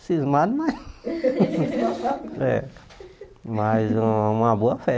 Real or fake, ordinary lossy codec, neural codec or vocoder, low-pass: real; none; none; none